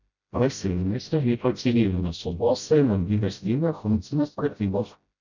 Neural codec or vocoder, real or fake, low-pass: codec, 16 kHz, 0.5 kbps, FreqCodec, smaller model; fake; 7.2 kHz